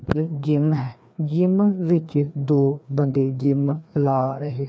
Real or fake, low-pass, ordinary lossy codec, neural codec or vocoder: fake; none; none; codec, 16 kHz, 2 kbps, FreqCodec, larger model